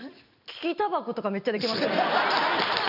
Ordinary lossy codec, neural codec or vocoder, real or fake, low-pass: none; none; real; 5.4 kHz